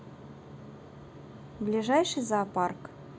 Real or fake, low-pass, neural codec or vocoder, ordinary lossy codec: real; none; none; none